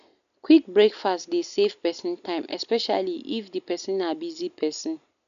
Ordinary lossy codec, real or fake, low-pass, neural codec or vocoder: none; real; 7.2 kHz; none